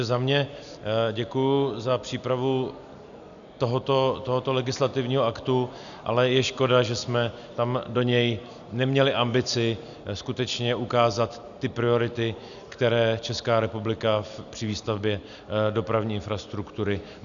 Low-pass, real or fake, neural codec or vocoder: 7.2 kHz; real; none